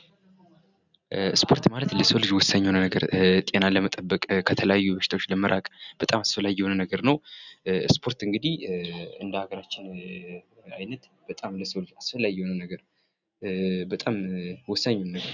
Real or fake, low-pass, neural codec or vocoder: real; 7.2 kHz; none